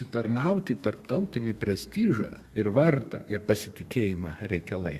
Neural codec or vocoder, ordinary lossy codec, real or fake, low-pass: codec, 32 kHz, 1.9 kbps, SNAC; Opus, 64 kbps; fake; 14.4 kHz